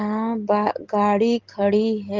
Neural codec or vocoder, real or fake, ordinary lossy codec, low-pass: none; real; Opus, 16 kbps; 7.2 kHz